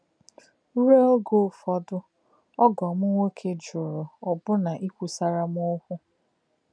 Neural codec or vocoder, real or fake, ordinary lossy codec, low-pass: none; real; none; 9.9 kHz